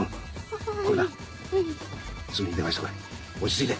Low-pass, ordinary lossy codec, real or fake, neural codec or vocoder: none; none; real; none